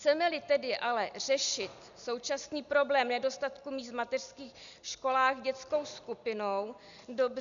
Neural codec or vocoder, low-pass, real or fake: none; 7.2 kHz; real